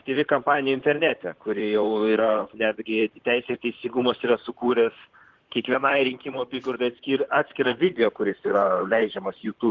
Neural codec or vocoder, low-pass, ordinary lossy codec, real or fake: vocoder, 44.1 kHz, 128 mel bands, Pupu-Vocoder; 7.2 kHz; Opus, 16 kbps; fake